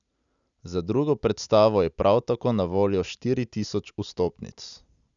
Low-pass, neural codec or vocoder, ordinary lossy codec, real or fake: 7.2 kHz; none; none; real